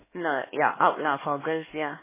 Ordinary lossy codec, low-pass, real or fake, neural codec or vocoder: MP3, 16 kbps; 3.6 kHz; fake; codec, 16 kHz, 2 kbps, X-Codec, HuBERT features, trained on LibriSpeech